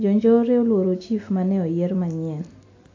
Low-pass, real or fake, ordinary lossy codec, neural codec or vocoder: 7.2 kHz; real; AAC, 32 kbps; none